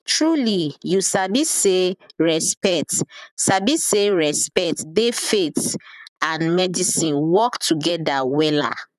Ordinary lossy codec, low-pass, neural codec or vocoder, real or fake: none; 14.4 kHz; vocoder, 44.1 kHz, 128 mel bands, Pupu-Vocoder; fake